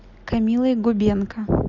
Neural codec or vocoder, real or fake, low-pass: none; real; 7.2 kHz